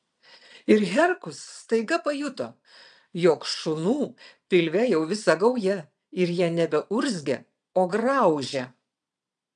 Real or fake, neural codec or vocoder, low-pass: fake; vocoder, 22.05 kHz, 80 mel bands, Vocos; 9.9 kHz